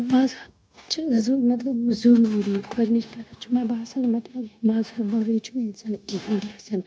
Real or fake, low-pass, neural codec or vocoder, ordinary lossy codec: fake; none; codec, 16 kHz, 0.9 kbps, LongCat-Audio-Codec; none